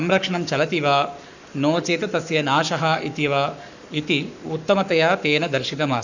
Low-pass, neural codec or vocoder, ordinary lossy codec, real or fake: 7.2 kHz; codec, 44.1 kHz, 7.8 kbps, Pupu-Codec; none; fake